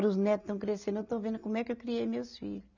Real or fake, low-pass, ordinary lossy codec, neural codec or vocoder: real; 7.2 kHz; none; none